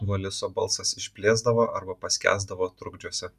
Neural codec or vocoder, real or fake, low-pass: none; real; 14.4 kHz